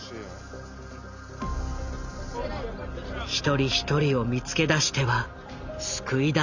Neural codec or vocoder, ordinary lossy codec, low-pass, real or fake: none; none; 7.2 kHz; real